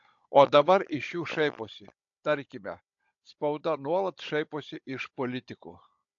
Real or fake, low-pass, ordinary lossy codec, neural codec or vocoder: fake; 7.2 kHz; AAC, 64 kbps; codec, 16 kHz, 16 kbps, FunCodec, trained on Chinese and English, 50 frames a second